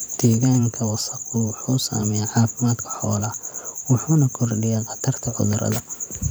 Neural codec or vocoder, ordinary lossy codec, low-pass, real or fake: vocoder, 44.1 kHz, 128 mel bands every 512 samples, BigVGAN v2; none; none; fake